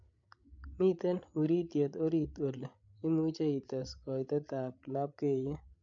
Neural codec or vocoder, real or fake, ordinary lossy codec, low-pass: codec, 16 kHz, 8 kbps, FreqCodec, larger model; fake; MP3, 64 kbps; 7.2 kHz